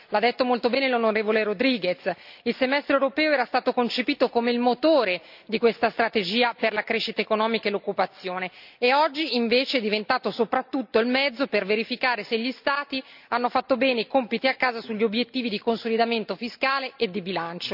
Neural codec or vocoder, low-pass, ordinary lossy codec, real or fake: none; 5.4 kHz; none; real